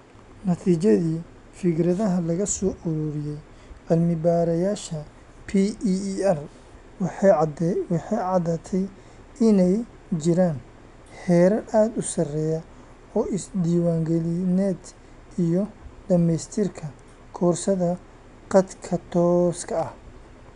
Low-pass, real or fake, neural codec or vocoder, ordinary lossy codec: 10.8 kHz; real; none; none